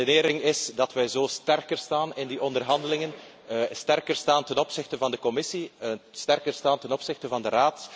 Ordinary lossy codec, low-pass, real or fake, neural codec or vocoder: none; none; real; none